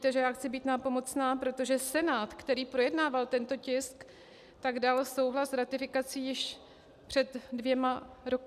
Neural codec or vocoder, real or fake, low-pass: none; real; 14.4 kHz